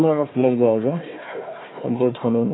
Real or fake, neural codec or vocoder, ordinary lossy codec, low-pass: fake; codec, 16 kHz, 1 kbps, FunCodec, trained on Chinese and English, 50 frames a second; AAC, 16 kbps; 7.2 kHz